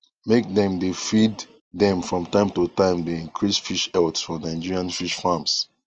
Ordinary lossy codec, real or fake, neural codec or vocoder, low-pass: Opus, 24 kbps; real; none; 7.2 kHz